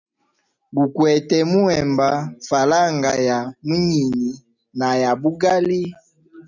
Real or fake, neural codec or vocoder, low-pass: real; none; 7.2 kHz